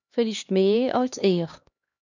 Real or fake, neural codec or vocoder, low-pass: fake; codec, 16 kHz, 2 kbps, X-Codec, HuBERT features, trained on LibriSpeech; 7.2 kHz